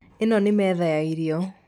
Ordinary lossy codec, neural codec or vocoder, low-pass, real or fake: none; none; 19.8 kHz; real